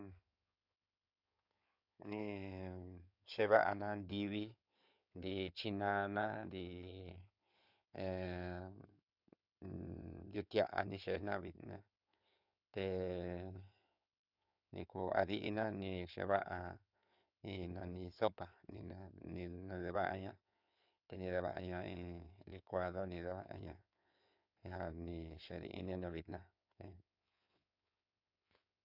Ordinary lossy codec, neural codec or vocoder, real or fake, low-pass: AAC, 48 kbps; codec, 16 kHz in and 24 kHz out, 2.2 kbps, FireRedTTS-2 codec; fake; 5.4 kHz